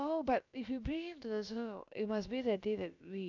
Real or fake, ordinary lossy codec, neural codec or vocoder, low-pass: fake; none; codec, 16 kHz, about 1 kbps, DyCAST, with the encoder's durations; 7.2 kHz